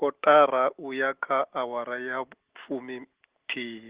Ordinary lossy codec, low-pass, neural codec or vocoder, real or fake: Opus, 16 kbps; 3.6 kHz; none; real